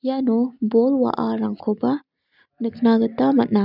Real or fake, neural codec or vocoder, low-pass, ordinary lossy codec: real; none; 5.4 kHz; none